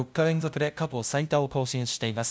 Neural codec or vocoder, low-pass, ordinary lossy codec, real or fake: codec, 16 kHz, 0.5 kbps, FunCodec, trained on LibriTTS, 25 frames a second; none; none; fake